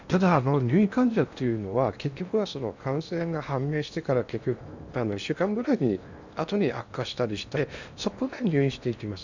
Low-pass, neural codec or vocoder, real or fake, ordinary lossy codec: 7.2 kHz; codec, 16 kHz in and 24 kHz out, 0.8 kbps, FocalCodec, streaming, 65536 codes; fake; none